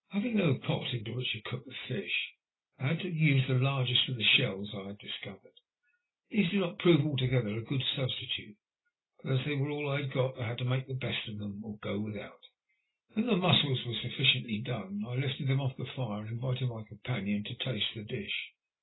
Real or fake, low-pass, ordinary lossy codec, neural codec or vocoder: real; 7.2 kHz; AAC, 16 kbps; none